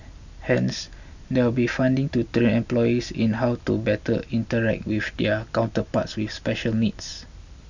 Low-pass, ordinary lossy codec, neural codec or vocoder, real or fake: 7.2 kHz; none; none; real